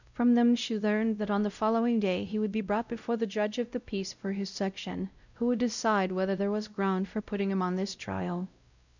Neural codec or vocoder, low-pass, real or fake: codec, 16 kHz, 0.5 kbps, X-Codec, WavLM features, trained on Multilingual LibriSpeech; 7.2 kHz; fake